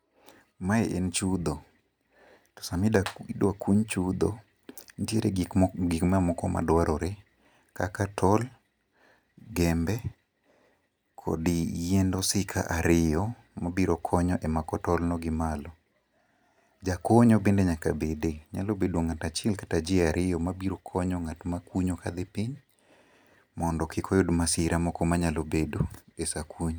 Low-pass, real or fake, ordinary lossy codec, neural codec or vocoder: none; real; none; none